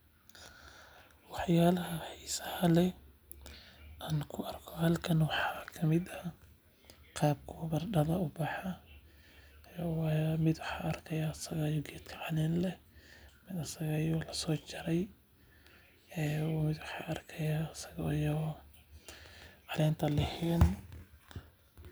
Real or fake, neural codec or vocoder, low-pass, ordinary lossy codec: real; none; none; none